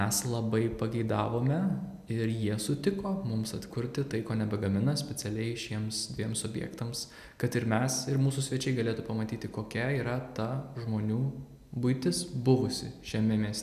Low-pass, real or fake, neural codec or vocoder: 14.4 kHz; real; none